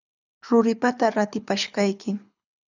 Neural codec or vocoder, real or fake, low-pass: codec, 24 kHz, 6 kbps, HILCodec; fake; 7.2 kHz